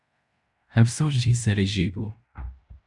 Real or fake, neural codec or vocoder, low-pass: fake; codec, 16 kHz in and 24 kHz out, 0.9 kbps, LongCat-Audio-Codec, fine tuned four codebook decoder; 10.8 kHz